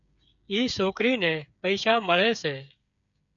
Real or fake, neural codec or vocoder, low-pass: fake; codec, 16 kHz, 8 kbps, FreqCodec, smaller model; 7.2 kHz